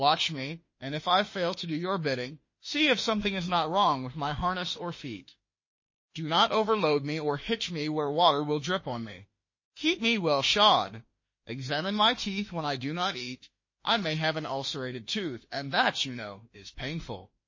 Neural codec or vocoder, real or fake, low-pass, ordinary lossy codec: autoencoder, 48 kHz, 32 numbers a frame, DAC-VAE, trained on Japanese speech; fake; 7.2 kHz; MP3, 32 kbps